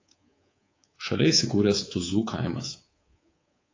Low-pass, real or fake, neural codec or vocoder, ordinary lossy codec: 7.2 kHz; fake; codec, 24 kHz, 3.1 kbps, DualCodec; AAC, 32 kbps